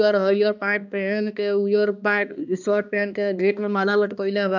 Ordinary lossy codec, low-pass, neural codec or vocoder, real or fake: none; 7.2 kHz; codec, 16 kHz, 2 kbps, X-Codec, HuBERT features, trained on balanced general audio; fake